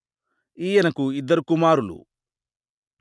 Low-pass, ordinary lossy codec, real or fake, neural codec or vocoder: none; none; real; none